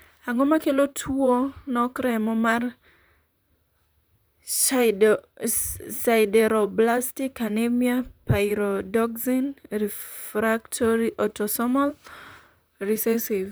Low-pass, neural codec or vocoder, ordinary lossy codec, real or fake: none; vocoder, 44.1 kHz, 128 mel bands, Pupu-Vocoder; none; fake